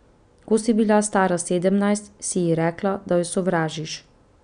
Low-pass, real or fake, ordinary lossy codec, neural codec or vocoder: 9.9 kHz; real; none; none